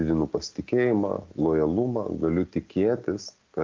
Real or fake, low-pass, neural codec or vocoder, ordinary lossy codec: real; 7.2 kHz; none; Opus, 32 kbps